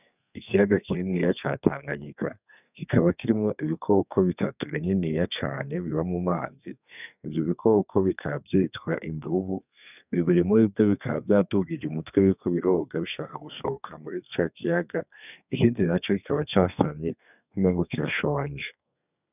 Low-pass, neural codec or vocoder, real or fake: 3.6 kHz; codec, 32 kHz, 1.9 kbps, SNAC; fake